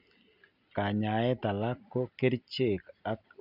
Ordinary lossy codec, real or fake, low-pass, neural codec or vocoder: none; real; 5.4 kHz; none